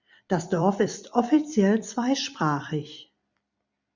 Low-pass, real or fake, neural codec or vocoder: 7.2 kHz; fake; vocoder, 44.1 kHz, 128 mel bands every 256 samples, BigVGAN v2